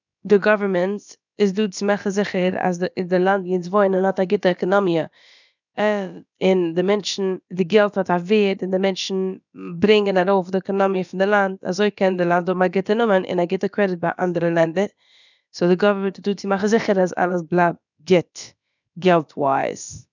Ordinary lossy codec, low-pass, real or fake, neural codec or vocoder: none; 7.2 kHz; fake; codec, 16 kHz, about 1 kbps, DyCAST, with the encoder's durations